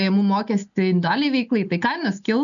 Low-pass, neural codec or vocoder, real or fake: 7.2 kHz; none; real